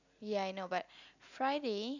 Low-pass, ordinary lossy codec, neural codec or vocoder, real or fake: 7.2 kHz; none; none; real